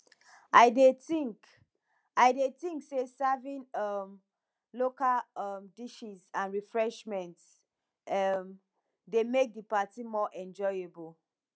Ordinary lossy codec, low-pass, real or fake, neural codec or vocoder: none; none; real; none